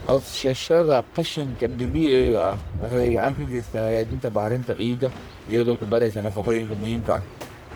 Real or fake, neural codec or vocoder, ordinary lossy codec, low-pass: fake; codec, 44.1 kHz, 1.7 kbps, Pupu-Codec; none; none